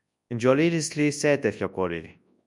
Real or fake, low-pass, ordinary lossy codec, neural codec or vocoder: fake; 10.8 kHz; MP3, 96 kbps; codec, 24 kHz, 0.9 kbps, WavTokenizer, large speech release